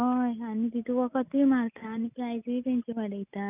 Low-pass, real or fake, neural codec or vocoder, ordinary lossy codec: 3.6 kHz; real; none; none